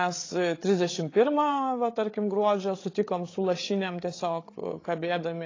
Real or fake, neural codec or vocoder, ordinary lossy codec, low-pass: fake; codec, 16 kHz, 8 kbps, FreqCodec, larger model; AAC, 32 kbps; 7.2 kHz